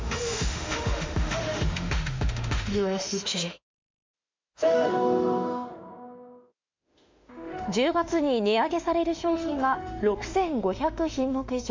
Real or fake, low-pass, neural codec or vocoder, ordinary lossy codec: fake; 7.2 kHz; autoencoder, 48 kHz, 32 numbers a frame, DAC-VAE, trained on Japanese speech; MP3, 64 kbps